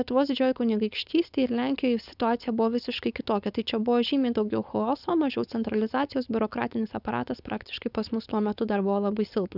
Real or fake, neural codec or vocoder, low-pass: fake; codec, 16 kHz, 4.8 kbps, FACodec; 5.4 kHz